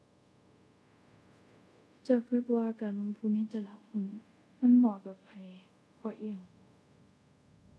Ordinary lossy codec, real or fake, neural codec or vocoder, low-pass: none; fake; codec, 24 kHz, 0.5 kbps, DualCodec; none